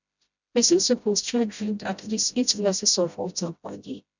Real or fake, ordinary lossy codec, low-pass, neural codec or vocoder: fake; none; 7.2 kHz; codec, 16 kHz, 0.5 kbps, FreqCodec, smaller model